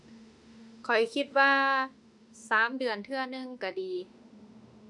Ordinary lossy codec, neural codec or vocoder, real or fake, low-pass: none; autoencoder, 48 kHz, 32 numbers a frame, DAC-VAE, trained on Japanese speech; fake; 10.8 kHz